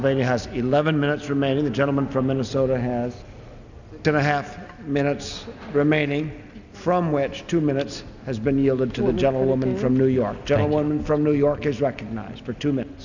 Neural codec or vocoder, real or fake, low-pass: none; real; 7.2 kHz